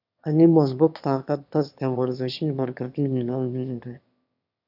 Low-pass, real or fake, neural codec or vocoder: 5.4 kHz; fake; autoencoder, 22.05 kHz, a latent of 192 numbers a frame, VITS, trained on one speaker